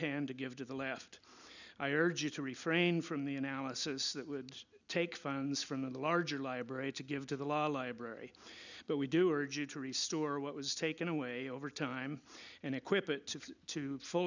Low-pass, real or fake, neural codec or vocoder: 7.2 kHz; real; none